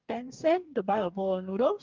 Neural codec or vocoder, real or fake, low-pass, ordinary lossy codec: codec, 44.1 kHz, 2.6 kbps, DAC; fake; 7.2 kHz; Opus, 24 kbps